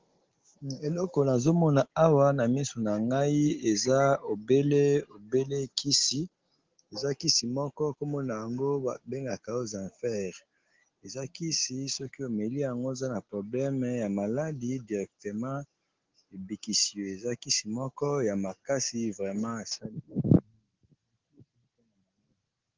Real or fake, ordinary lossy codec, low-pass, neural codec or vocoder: real; Opus, 16 kbps; 7.2 kHz; none